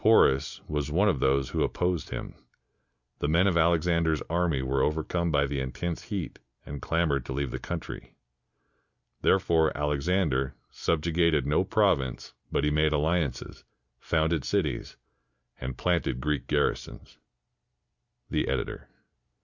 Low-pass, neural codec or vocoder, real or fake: 7.2 kHz; none; real